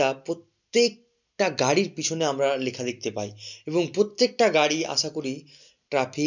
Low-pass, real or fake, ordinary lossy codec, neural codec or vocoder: 7.2 kHz; real; none; none